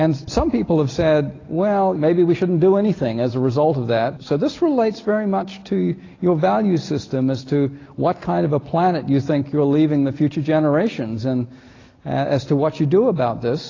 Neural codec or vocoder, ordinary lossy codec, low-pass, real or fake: none; AAC, 32 kbps; 7.2 kHz; real